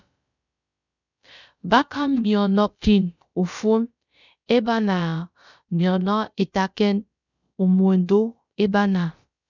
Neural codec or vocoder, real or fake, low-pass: codec, 16 kHz, about 1 kbps, DyCAST, with the encoder's durations; fake; 7.2 kHz